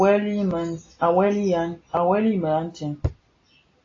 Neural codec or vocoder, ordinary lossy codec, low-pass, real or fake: none; AAC, 32 kbps; 7.2 kHz; real